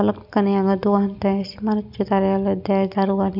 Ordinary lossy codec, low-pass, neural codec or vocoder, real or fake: none; 5.4 kHz; none; real